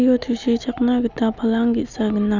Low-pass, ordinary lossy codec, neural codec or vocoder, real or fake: 7.2 kHz; none; none; real